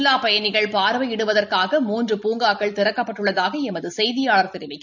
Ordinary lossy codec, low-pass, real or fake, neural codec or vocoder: none; 7.2 kHz; real; none